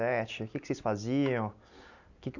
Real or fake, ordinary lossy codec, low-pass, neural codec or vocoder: real; none; 7.2 kHz; none